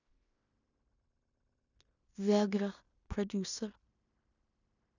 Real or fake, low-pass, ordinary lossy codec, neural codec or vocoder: fake; 7.2 kHz; none; codec, 16 kHz in and 24 kHz out, 0.9 kbps, LongCat-Audio-Codec, fine tuned four codebook decoder